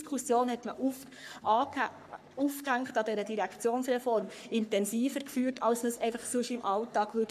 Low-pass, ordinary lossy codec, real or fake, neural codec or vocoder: 14.4 kHz; none; fake; codec, 44.1 kHz, 3.4 kbps, Pupu-Codec